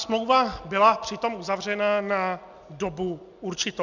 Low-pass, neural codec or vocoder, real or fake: 7.2 kHz; none; real